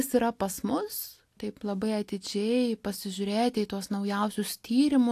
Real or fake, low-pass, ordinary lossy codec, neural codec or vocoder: real; 14.4 kHz; AAC, 64 kbps; none